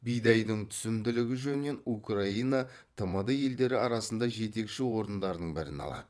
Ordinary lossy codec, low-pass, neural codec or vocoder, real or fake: none; none; vocoder, 22.05 kHz, 80 mel bands, WaveNeXt; fake